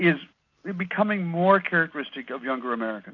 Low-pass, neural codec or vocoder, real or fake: 7.2 kHz; none; real